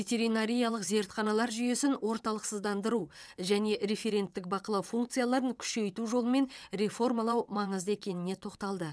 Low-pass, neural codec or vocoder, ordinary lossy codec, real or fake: none; vocoder, 22.05 kHz, 80 mel bands, WaveNeXt; none; fake